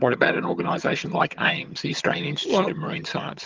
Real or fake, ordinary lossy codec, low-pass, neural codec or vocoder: fake; Opus, 32 kbps; 7.2 kHz; vocoder, 22.05 kHz, 80 mel bands, HiFi-GAN